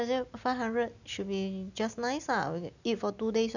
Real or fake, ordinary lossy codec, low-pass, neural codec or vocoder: real; none; 7.2 kHz; none